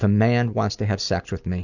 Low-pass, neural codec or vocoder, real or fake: 7.2 kHz; none; real